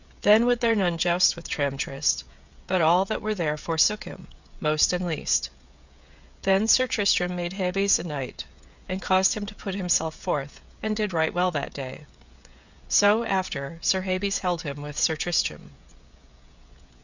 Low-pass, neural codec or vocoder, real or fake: 7.2 kHz; codec, 16 kHz, 16 kbps, FreqCodec, smaller model; fake